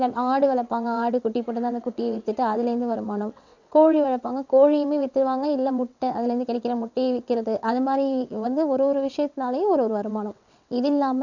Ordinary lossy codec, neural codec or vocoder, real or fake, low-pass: none; vocoder, 22.05 kHz, 80 mel bands, WaveNeXt; fake; 7.2 kHz